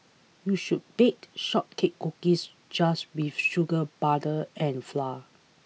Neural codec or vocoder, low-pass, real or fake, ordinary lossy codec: none; none; real; none